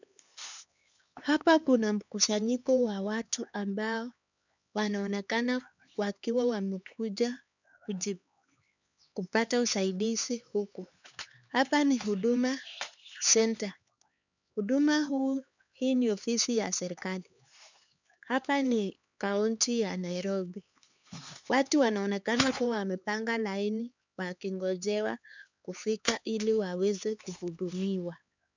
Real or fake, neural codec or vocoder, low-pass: fake; codec, 16 kHz, 4 kbps, X-Codec, HuBERT features, trained on LibriSpeech; 7.2 kHz